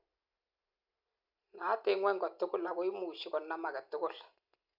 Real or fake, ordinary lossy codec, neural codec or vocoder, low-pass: real; none; none; 5.4 kHz